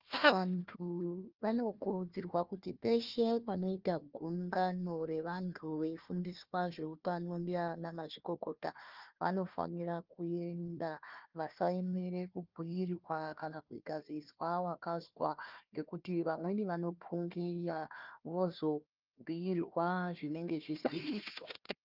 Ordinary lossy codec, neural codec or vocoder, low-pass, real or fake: Opus, 24 kbps; codec, 16 kHz, 1 kbps, FunCodec, trained on Chinese and English, 50 frames a second; 5.4 kHz; fake